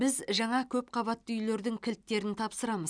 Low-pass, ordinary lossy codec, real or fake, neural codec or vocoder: 9.9 kHz; none; real; none